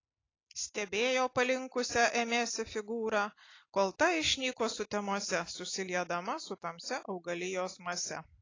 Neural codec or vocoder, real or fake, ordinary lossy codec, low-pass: none; real; AAC, 32 kbps; 7.2 kHz